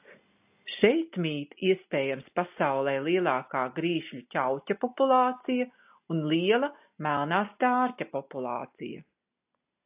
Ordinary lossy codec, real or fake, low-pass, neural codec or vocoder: AAC, 32 kbps; real; 3.6 kHz; none